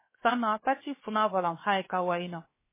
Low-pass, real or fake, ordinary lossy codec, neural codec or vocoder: 3.6 kHz; fake; MP3, 16 kbps; codec, 16 kHz, about 1 kbps, DyCAST, with the encoder's durations